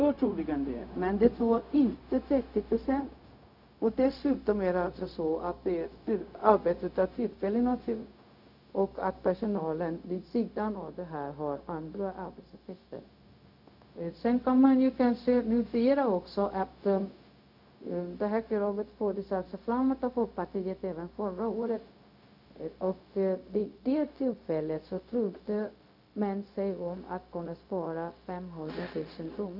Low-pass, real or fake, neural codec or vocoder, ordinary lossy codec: 5.4 kHz; fake; codec, 16 kHz, 0.4 kbps, LongCat-Audio-Codec; none